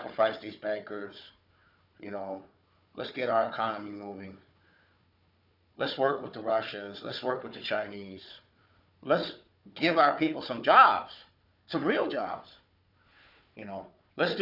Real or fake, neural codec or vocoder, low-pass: fake; codec, 16 kHz, 4 kbps, FunCodec, trained on Chinese and English, 50 frames a second; 5.4 kHz